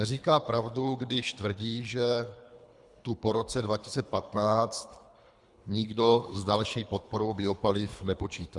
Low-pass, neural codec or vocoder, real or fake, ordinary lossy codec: 10.8 kHz; codec, 24 kHz, 3 kbps, HILCodec; fake; Opus, 64 kbps